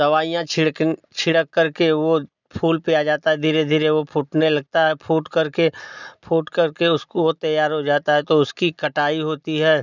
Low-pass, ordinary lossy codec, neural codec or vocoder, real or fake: 7.2 kHz; none; none; real